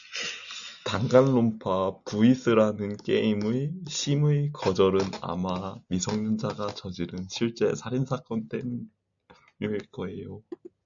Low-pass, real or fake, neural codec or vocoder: 7.2 kHz; real; none